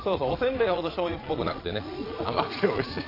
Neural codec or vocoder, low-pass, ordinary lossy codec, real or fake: vocoder, 22.05 kHz, 80 mel bands, Vocos; 5.4 kHz; AAC, 24 kbps; fake